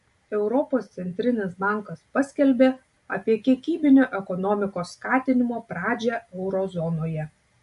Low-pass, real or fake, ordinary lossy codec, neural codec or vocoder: 14.4 kHz; real; MP3, 48 kbps; none